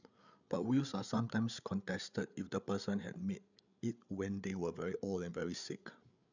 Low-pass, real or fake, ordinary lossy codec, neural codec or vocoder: 7.2 kHz; fake; none; codec, 16 kHz, 8 kbps, FreqCodec, larger model